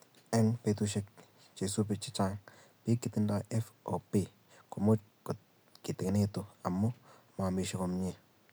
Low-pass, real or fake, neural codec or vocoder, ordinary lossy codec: none; real; none; none